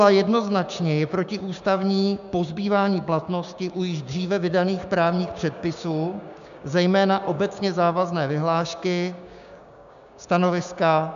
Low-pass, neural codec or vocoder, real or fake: 7.2 kHz; codec, 16 kHz, 6 kbps, DAC; fake